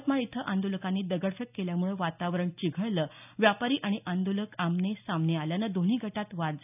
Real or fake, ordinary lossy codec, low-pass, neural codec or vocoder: real; none; 3.6 kHz; none